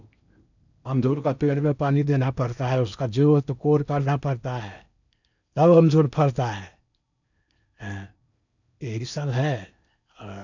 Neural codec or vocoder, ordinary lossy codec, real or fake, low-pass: codec, 16 kHz in and 24 kHz out, 0.8 kbps, FocalCodec, streaming, 65536 codes; none; fake; 7.2 kHz